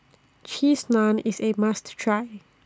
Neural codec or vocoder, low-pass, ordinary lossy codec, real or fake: none; none; none; real